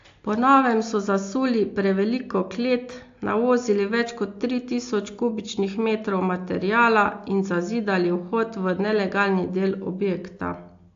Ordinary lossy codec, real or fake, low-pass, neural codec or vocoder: AAC, 48 kbps; real; 7.2 kHz; none